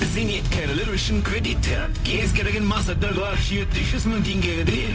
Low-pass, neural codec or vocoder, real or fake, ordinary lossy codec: none; codec, 16 kHz, 0.4 kbps, LongCat-Audio-Codec; fake; none